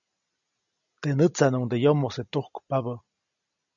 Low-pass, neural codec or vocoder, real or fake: 7.2 kHz; none; real